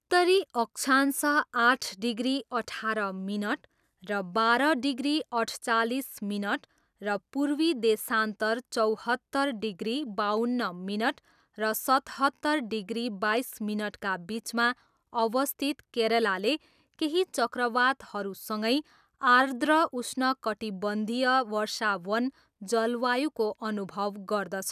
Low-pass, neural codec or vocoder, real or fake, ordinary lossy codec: 14.4 kHz; none; real; none